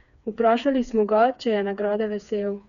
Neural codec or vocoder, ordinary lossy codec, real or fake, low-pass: codec, 16 kHz, 4 kbps, FreqCodec, smaller model; none; fake; 7.2 kHz